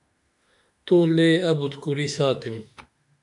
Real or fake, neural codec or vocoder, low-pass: fake; autoencoder, 48 kHz, 32 numbers a frame, DAC-VAE, trained on Japanese speech; 10.8 kHz